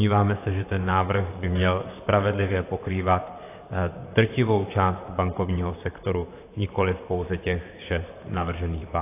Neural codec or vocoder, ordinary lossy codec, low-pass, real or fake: vocoder, 44.1 kHz, 128 mel bands, Pupu-Vocoder; AAC, 24 kbps; 3.6 kHz; fake